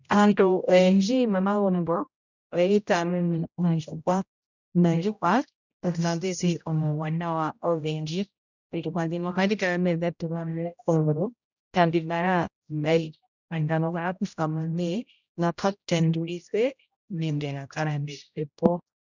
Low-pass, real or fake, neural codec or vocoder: 7.2 kHz; fake; codec, 16 kHz, 0.5 kbps, X-Codec, HuBERT features, trained on general audio